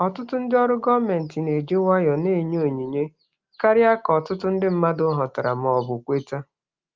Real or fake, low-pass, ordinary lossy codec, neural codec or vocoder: real; 7.2 kHz; Opus, 32 kbps; none